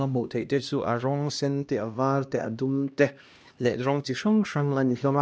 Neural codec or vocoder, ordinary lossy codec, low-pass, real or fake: codec, 16 kHz, 1 kbps, X-Codec, HuBERT features, trained on LibriSpeech; none; none; fake